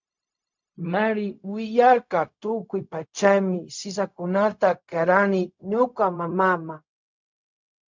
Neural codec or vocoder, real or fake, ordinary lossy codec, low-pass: codec, 16 kHz, 0.4 kbps, LongCat-Audio-Codec; fake; MP3, 64 kbps; 7.2 kHz